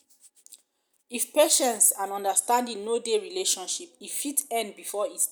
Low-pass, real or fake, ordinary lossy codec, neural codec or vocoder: none; real; none; none